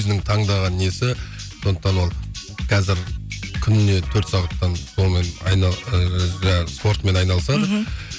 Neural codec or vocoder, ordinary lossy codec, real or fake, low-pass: none; none; real; none